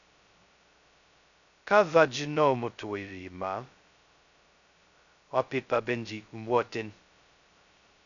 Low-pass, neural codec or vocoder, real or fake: 7.2 kHz; codec, 16 kHz, 0.2 kbps, FocalCodec; fake